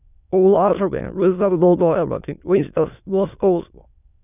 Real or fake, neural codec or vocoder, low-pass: fake; autoencoder, 22.05 kHz, a latent of 192 numbers a frame, VITS, trained on many speakers; 3.6 kHz